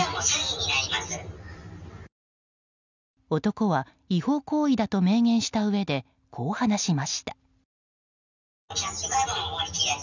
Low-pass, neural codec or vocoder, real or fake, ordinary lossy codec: 7.2 kHz; none; real; none